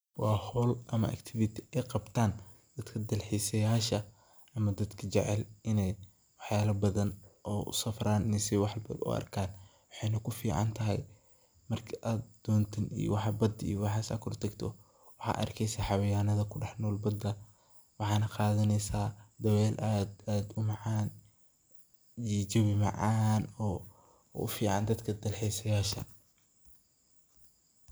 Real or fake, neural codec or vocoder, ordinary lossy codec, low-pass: real; none; none; none